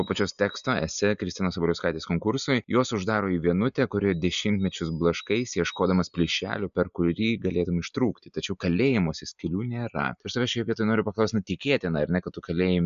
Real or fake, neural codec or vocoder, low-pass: real; none; 7.2 kHz